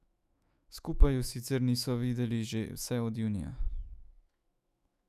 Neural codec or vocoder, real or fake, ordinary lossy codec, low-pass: autoencoder, 48 kHz, 128 numbers a frame, DAC-VAE, trained on Japanese speech; fake; none; 14.4 kHz